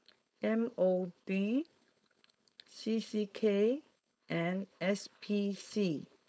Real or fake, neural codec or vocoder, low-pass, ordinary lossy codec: fake; codec, 16 kHz, 4.8 kbps, FACodec; none; none